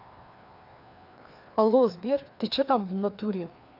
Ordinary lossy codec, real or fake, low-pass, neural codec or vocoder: none; fake; 5.4 kHz; codec, 16 kHz, 2 kbps, FreqCodec, larger model